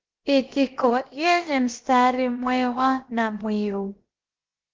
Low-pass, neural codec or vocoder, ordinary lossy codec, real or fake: 7.2 kHz; codec, 16 kHz, about 1 kbps, DyCAST, with the encoder's durations; Opus, 16 kbps; fake